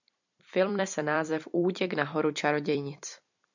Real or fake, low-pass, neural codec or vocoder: fake; 7.2 kHz; vocoder, 44.1 kHz, 128 mel bands every 256 samples, BigVGAN v2